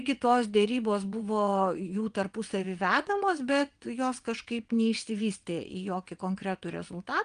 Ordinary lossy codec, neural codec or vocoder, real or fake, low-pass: Opus, 32 kbps; vocoder, 22.05 kHz, 80 mel bands, WaveNeXt; fake; 9.9 kHz